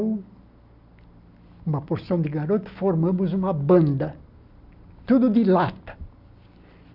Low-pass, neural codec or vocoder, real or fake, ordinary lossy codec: 5.4 kHz; none; real; none